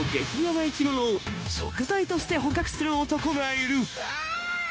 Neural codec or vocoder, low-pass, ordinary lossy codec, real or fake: codec, 16 kHz, 0.9 kbps, LongCat-Audio-Codec; none; none; fake